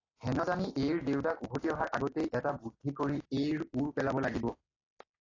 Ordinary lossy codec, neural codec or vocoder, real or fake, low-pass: AAC, 32 kbps; none; real; 7.2 kHz